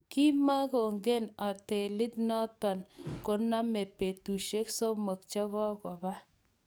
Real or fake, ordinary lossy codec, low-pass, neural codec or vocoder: fake; none; none; codec, 44.1 kHz, 7.8 kbps, DAC